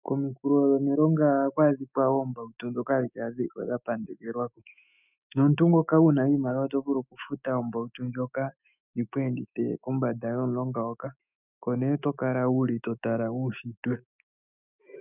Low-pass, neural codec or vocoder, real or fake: 3.6 kHz; autoencoder, 48 kHz, 128 numbers a frame, DAC-VAE, trained on Japanese speech; fake